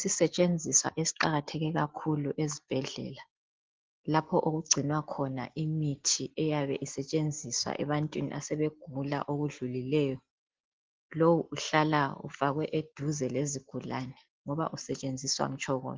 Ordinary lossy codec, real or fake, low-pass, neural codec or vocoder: Opus, 16 kbps; real; 7.2 kHz; none